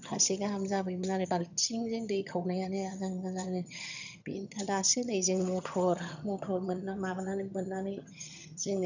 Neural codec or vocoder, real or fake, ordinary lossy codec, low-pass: vocoder, 22.05 kHz, 80 mel bands, HiFi-GAN; fake; none; 7.2 kHz